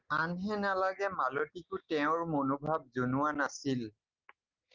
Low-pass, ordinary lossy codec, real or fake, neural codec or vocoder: 7.2 kHz; Opus, 32 kbps; real; none